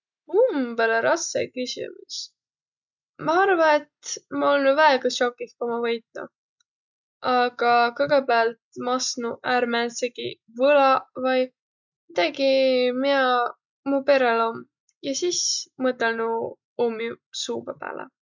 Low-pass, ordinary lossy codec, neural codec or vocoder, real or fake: 7.2 kHz; none; none; real